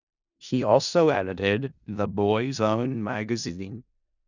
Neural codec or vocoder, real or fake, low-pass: codec, 16 kHz in and 24 kHz out, 0.4 kbps, LongCat-Audio-Codec, four codebook decoder; fake; 7.2 kHz